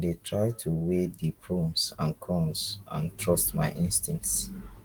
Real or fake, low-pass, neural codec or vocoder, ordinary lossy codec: fake; 19.8 kHz; autoencoder, 48 kHz, 128 numbers a frame, DAC-VAE, trained on Japanese speech; Opus, 24 kbps